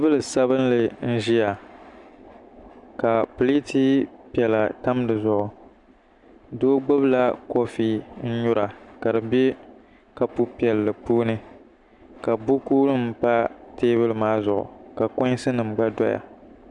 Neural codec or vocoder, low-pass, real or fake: none; 10.8 kHz; real